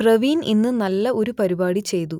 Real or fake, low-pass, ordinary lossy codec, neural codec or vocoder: real; 19.8 kHz; none; none